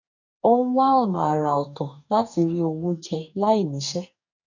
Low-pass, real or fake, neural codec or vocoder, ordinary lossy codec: 7.2 kHz; fake; codec, 44.1 kHz, 2.6 kbps, DAC; none